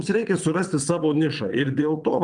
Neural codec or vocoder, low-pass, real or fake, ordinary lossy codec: vocoder, 22.05 kHz, 80 mel bands, WaveNeXt; 9.9 kHz; fake; Opus, 32 kbps